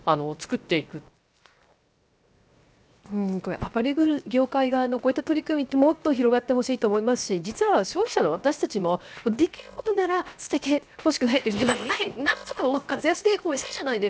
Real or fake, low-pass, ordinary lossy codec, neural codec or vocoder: fake; none; none; codec, 16 kHz, 0.7 kbps, FocalCodec